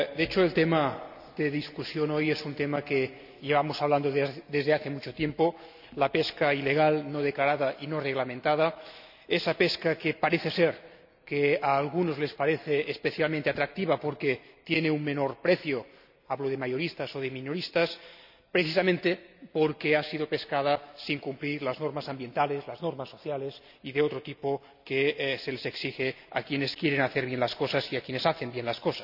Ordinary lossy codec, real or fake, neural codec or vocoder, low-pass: none; real; none; 5.4 kHz